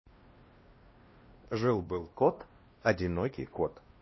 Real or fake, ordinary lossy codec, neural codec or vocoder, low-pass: fake; MP3, 24 kbps; codec, 16 kHz, 1 kbps, X-Codec, WavLM features, trained on Multilingual LibriSpeech; 7.2 kHz